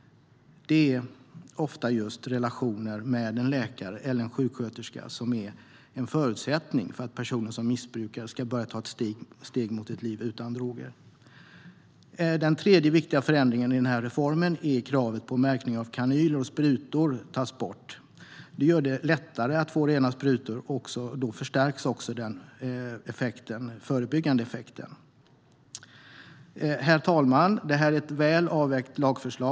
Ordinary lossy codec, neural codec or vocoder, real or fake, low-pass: none; none; real; none